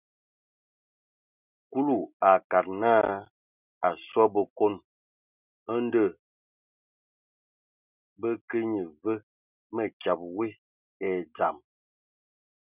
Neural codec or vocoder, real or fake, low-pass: none; real; 3.6 kHz